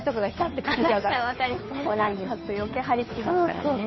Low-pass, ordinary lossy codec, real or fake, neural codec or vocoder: 7.2 kHz; MP3, 24 kbps; fake; codec, 16 kHz, 2 kbps, FunCodec, trained on Chinese and English, 25 frames a second